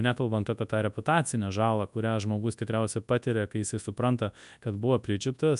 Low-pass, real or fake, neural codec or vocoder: 10.8 kHz; fake; codec, 24 kHz, 0.9 kbps, WavTokenizer, large speech release